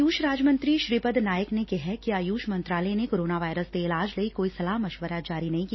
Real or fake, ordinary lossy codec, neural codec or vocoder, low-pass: real; MP3, 24 kbps; none; 7.2 kHz